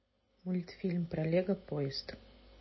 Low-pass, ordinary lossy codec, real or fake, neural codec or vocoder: 7.2 kHz; MP3, 24 kbps; real; none